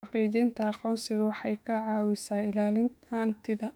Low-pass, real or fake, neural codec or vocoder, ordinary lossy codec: 19.8 kHz; fake; autoencoder, 48 kHz, 32 numbers a frame, DAC-VAE, trained on Japanese speech; none